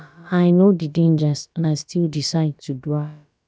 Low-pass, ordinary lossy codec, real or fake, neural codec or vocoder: none; none; fake; codec, 16 kHz, about 1 kbps, DyCAST, with the encoder's durations